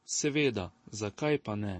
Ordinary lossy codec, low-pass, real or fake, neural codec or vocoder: MP3, 32 kbps; 9.9 kHz; real; none